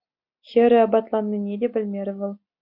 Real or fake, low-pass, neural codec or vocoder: real; 5.4 kHz; none